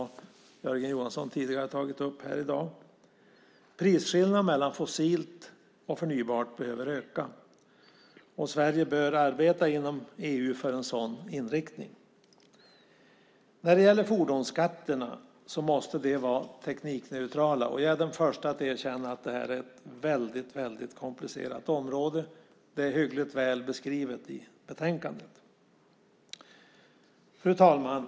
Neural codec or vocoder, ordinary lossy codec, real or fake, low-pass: none; none; real; none